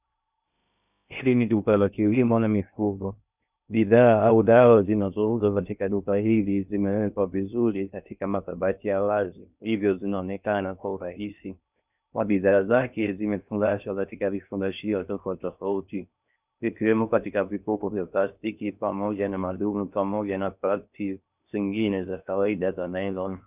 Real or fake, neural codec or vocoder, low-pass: fake; codec, 16 kHz in and 24 kHz out, 0.6 kbps, FocalCodec, streaming, 2048 codes; 3.6 kHz